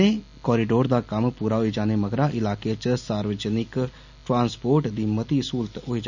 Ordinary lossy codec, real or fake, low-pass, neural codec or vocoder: none; real; 7.2 kHz; none